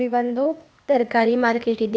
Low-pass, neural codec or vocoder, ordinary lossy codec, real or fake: none; codec, 16 kHz, 0.8 kbps, ZipCodec; none; fake